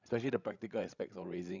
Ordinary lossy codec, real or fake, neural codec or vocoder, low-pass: Opus, 64 kbps; fake; codec, 16 kHz, 16 kbps, FreqCodec, larger model; 7.2 kHz